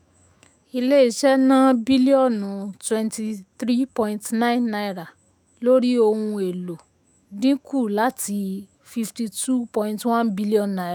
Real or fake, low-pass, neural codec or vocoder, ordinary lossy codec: fake; none; autoencoder, 48 kHz, 128 numbers a frame, DAC-VAE, trained on Japanese speech; none